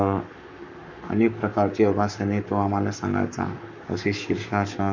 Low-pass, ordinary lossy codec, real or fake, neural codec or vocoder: 7.2 kHz; none; fake; codec, 44.1 kHz, 7.8 kbps, Pupu-Codec